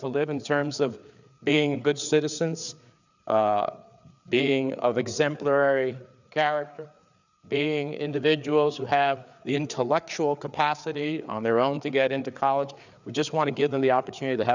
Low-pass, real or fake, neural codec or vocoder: 7.2 kHz; fake; codec, 16 kHz, 4 kbps, FreqCodec, larger model